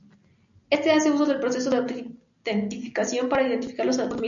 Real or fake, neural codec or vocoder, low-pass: real; none; 7.2 kHz